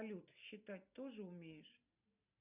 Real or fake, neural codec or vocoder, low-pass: real; none; 3.6 kHz